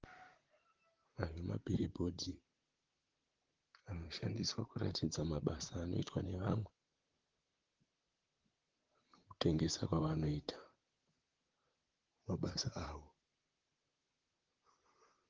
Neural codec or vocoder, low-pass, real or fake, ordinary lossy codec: vocoder, 44.1 kHz, 128 mel bands, Pupu-Vocoder; 7.2 kHz; fake; Opus, 16 kbps